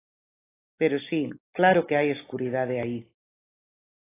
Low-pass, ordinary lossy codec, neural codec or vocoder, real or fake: 3.6 kHz; AAC, 16 kbps; none; real